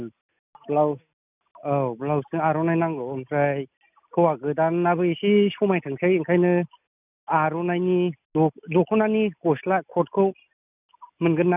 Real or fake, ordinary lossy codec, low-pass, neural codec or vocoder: real; none; 3.6 kHz; none